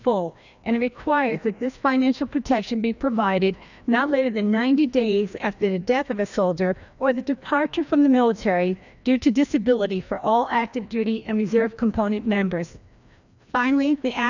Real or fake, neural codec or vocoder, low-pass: fake; codec, 16 kHz, 1 kbps, FreqCodec, larger model; 7.2 kHz